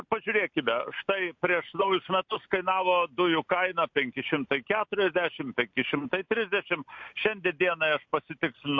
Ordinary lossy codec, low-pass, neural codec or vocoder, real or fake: MP3, 48 kbps; 7.2 kHz; none; real